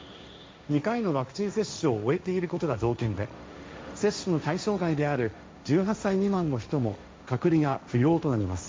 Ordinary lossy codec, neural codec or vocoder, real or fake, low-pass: none; codec, 16 kHz, 1.1 kbps, Voila-Tokenizer; fake; none